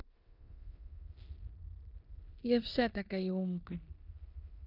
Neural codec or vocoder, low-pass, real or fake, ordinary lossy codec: codec, 16 kHz in and 24 kHz out, 0.9 kbps, LongCat-Audio-Codec, four codebook decoder; 5.4 kHz; fake; none